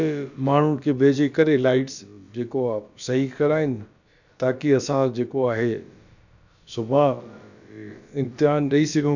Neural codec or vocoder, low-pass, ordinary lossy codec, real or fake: codec, 16 kHz, about 1 kbps, DyCAST, with the encoder's durations; 7.2 kHz; none; fake